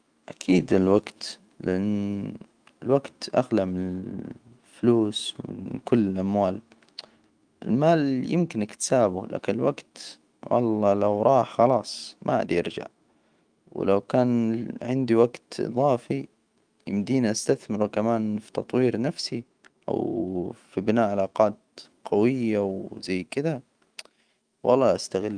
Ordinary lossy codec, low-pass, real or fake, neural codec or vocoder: Opus, 32 kbps; 9.9 kHz; fake; autoencoder, 48 kHz, 128 numbers a frame, DAC-VAE, trained on Japanese speech